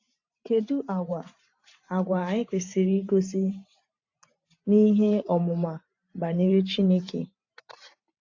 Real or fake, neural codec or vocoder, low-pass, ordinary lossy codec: fake; vocoder, 44.1 kHz, 128 mel bands every 512 samples, BigVGAN v2; 7.2 kHz; AAC, 48 kbps